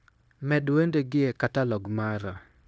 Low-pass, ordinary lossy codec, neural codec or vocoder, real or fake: none; none; codec, 16 kHz, 0.9 kbps, LongCat-Audio-Codec; fake